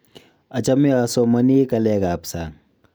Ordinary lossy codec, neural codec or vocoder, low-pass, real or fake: none; none; none; real